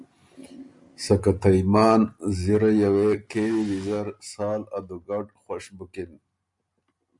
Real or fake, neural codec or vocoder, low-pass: real; none; 10.8 kHz